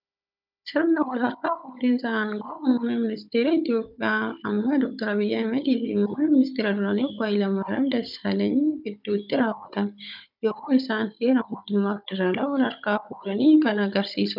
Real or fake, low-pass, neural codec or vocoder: fake; 5.4 kHz; codec, 16 kHz, 16 kbps, FunCodec, trained on Chinese and English, 50 frames a second